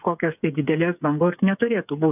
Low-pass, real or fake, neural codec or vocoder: 3.6 kHz; fake; codec, 16 kHz, 8 kbps, FreqCodec, smaller model